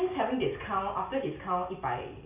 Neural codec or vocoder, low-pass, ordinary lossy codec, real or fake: none; 3.6 kHz; none; real